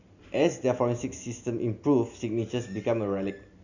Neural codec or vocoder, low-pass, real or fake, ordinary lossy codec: none; 7.2 kHz; real; none